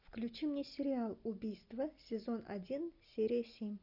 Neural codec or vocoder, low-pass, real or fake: none; 5.4 kHz; real